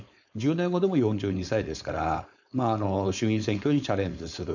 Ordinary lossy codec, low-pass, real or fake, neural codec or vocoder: none; 7.2 kHz; fake; codec, 16 kHz, 4.8 kbps, FACodec